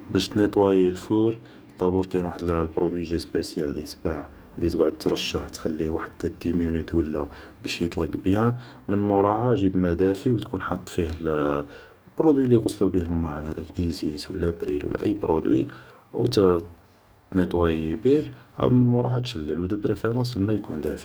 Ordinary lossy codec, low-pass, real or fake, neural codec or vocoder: none; none; fake; codec, 44.1 kHz, 2.6 kbps, DAC